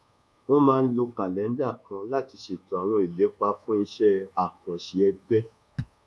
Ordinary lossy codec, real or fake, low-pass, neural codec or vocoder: none; fake; none; codec, 24 kHz, 1.2 kbps, DualCodec